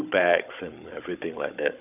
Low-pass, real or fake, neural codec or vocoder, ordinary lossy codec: 3.6 kHz; fake; codec, 16 kHz, 16 kbps, FunCodec, trained on Chinese and English, 50 frames a second; none